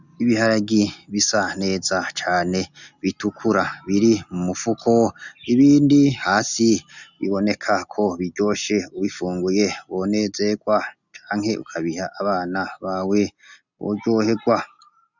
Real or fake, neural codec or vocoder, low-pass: real; none; 7.2 kHz